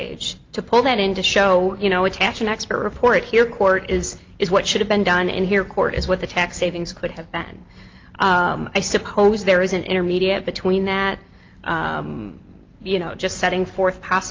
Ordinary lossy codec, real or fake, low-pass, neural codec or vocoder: Opus, 32 kbps; real; 7.2 kHz; none